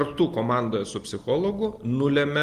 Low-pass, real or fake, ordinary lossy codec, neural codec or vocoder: 14.4 kHz; real; Opus, 24 kbps; none